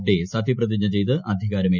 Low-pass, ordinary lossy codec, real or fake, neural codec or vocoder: 7.2 kHz; none; real; none